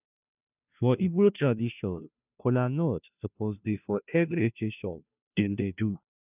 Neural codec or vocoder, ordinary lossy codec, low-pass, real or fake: codec, 16 kHz, 0.5 kbps, FunCodec, trained on Chinese and English, 25 frames a second; none; 3.6 kHz; fake